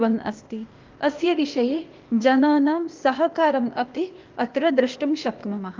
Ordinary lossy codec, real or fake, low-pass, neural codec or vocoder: Opus, 24 kbps; fake; 7.2 kHz; codec, 16 kHz, 0.8 kbps, ZipCodec